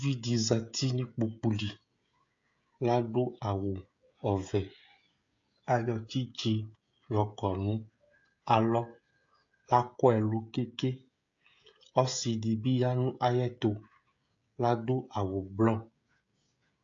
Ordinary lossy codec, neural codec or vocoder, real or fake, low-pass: AAC, 48 kbps; codec, 16 kHz, 16 kbps, FreqCodec, smaller model; fake; 7.2 kHz